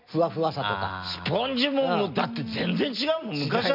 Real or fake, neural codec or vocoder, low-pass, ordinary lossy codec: real; none; 5.4 kHz; MP3, 48 kbps